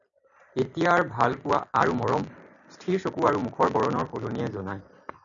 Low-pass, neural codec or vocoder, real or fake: 7.2 kHz; none; real